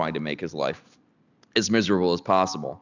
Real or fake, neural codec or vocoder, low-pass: real; none; 7.2 kHz